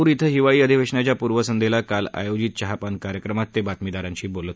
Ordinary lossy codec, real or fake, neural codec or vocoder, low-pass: none; real; none; none